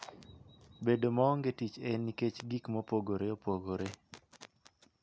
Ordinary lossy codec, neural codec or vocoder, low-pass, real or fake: none; none; none; real